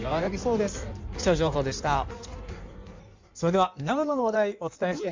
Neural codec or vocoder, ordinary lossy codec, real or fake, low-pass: codec, 16 kHz in and 24 kHz out, 1.1 kbps, FireRedTTS-2 codec; MP3, 64 kbps; fake; 7.2 kHz